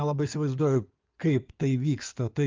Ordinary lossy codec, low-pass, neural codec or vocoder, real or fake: Opus, 32 kbps; 7.2 kHz; codec, 16 kHz in and 24 kHz out, 2.2 kbps, FireRedTTS-2 codec; fake